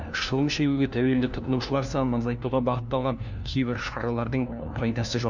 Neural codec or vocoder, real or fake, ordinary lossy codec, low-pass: codec, 16 kHz, 1 kbps, FunCodec, trained on LibriTTS, 50 frames a second; fake; none; 7.2 kHz